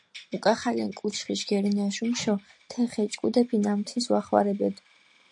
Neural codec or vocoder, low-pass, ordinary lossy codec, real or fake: none; 10.8 kHz; AAC, 64 kbps; real